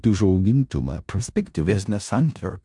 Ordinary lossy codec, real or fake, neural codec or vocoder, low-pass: MP3, 64 kbps; fake; codec, 16 kHz in and 24 kHz out, 0.4 kbps, LongCat-Audio-Codec, four codebook decoder; 10.8 kHz